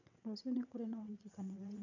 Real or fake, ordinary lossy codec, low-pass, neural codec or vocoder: fake; none; 7.2 kHz; vocoder, 22.05 kHz, 80 mel bands, WaveNeXt